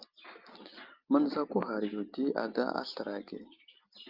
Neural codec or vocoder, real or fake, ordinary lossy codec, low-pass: none; real; Opus, 24 kbps; 5.4 kHz